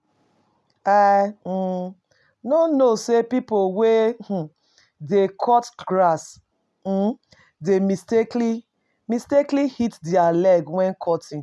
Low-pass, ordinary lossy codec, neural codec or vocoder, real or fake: none; none; none; real